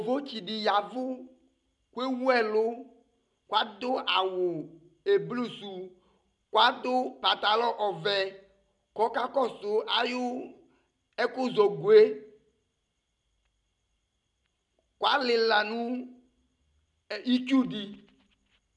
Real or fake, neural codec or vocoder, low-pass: real; none; 10.8 kHz